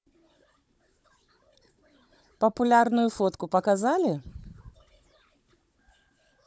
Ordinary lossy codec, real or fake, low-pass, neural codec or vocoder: none; fake; none; codec, 16 kHz, 16 kbps, FunCodec, trained on Chinese and English, 50 frames a second